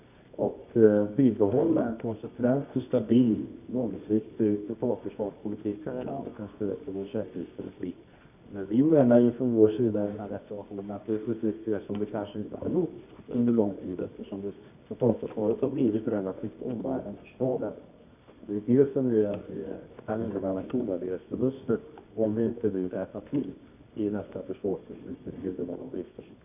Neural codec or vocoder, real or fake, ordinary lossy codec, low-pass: codec, 24 kHz, 0.9 kbps, WavTokenizer, medium music audio release; fake; none; 3.6 kHz